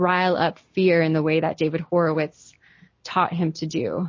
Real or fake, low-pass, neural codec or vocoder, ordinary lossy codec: real; 7.2 kHz; none; MP3, 32 kbps